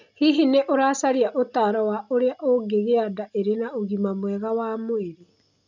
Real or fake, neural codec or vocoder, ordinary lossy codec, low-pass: real; none; none; 7.2 kHz